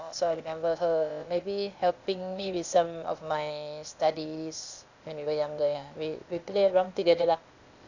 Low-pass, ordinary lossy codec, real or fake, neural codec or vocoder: 7.2 kHz; none; fake; codec, 16 kHz, 0.8 kbps, ZipCodec